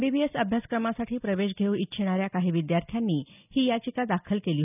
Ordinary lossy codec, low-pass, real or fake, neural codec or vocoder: none; 3.6 kHz; real; none